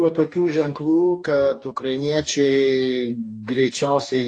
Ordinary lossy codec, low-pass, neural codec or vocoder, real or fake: AAC, 48 kbps; 9.9 kHz; codec, 44.1 kHz, 2.6 kbps, DAC; fake